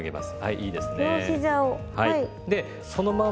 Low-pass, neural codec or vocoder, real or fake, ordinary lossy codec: none; none; real; none